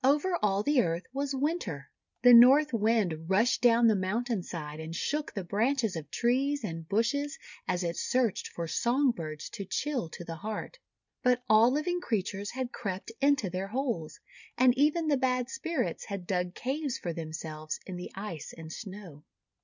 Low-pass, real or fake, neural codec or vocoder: 7.2 kHz; real; none